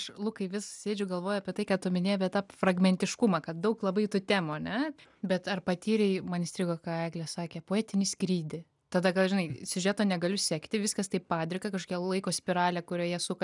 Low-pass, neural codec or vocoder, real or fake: 10.8 kHz; none; real